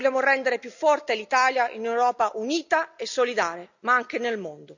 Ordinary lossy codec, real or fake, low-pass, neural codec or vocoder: none; real; 7.2 kHz; none